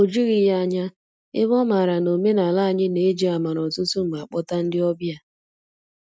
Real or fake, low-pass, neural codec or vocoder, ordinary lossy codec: real; none; none; none